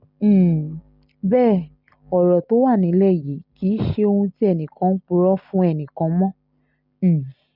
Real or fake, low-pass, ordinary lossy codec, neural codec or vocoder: real; 5.4 kHz; none; none